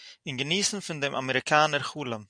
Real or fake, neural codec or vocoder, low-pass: real; none; 9.9 kHz